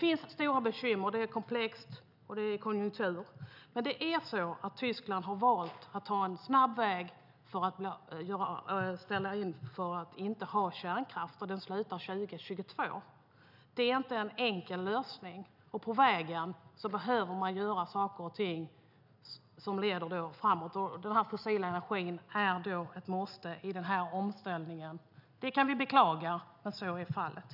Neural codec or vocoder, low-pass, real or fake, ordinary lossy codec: none; 5.4 kHz; real; none